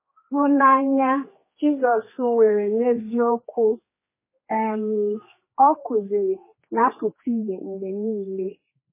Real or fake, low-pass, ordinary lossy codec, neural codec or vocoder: fake; 3.6 kHz; MP3, 16 kbps; codec, 16 kHz, 2 kbps, X-Codec, HuBERT features, trained on general audio